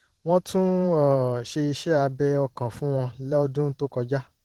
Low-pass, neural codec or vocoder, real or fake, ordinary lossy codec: 19.8 kHz; vocoder, 44.1 kHz, 128 mel bands every 512 samples, BigVGAN v2; fake; Opus, 16 kbps